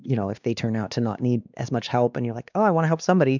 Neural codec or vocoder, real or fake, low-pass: codec, 16 kHz, 2 kbps, X-Codec, WavLM features, trained on Multilingual LibriSpeech; fake; 7.2 kHz